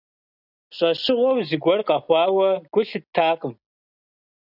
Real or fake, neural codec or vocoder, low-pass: real; none; 5.4 kHz